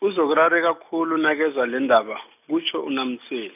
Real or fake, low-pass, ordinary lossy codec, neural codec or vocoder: real; 3.6 kHz; none; none